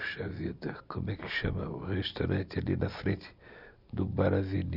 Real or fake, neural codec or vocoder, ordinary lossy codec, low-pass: real; none; none; 5.4 kHz